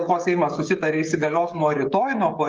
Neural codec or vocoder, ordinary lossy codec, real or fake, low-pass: codec, 16 kHz, 16 kbps, FreqCodec, smaller model; Opus, 32 kbps; fake; 7.2 kHz